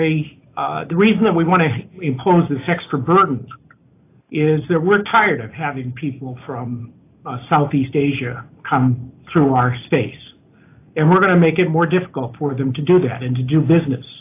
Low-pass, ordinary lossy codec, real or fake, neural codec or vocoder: 3.6 kHz; AAC, 24 kbps; real; none